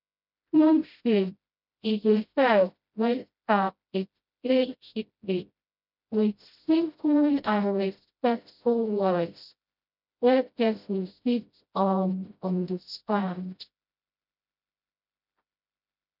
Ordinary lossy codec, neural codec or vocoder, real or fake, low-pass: none; codec, 16 kHz, 0.5 kbps, FreqCodec, smaller model; fake; 5.4 kHz